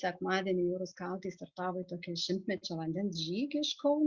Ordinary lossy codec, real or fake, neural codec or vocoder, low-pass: Opus, 64 kbps; real; none; 7.2 kHz